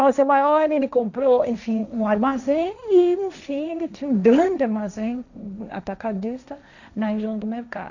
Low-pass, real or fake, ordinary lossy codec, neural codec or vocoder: 7.2 kHz; fake; none; codec, 16 kHz, 1.1 kbps, Voila-Tokenizer